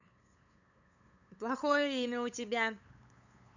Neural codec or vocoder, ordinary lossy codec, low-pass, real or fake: codec, 16 kHz, 8 kbps, FunCodec, trained on LibriTTS, 25 frames a second; none; 7.2 kHz; fake